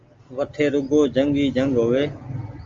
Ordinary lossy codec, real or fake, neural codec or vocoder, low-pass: Opus, 32 kbps; real; none; 7.2 kHz